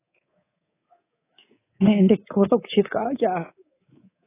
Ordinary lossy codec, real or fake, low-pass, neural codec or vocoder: AAC, 16 kbps; fake; 3.6 kHz; codec, 24 kHz, 3.1 kbps, DualCodec